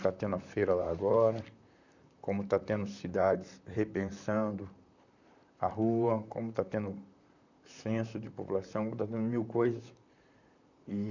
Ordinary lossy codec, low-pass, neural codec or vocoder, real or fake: none; 7.2 kHz; vocoder, 44.1 kHz, 128 mel bands, Pupu-Vocoder; fake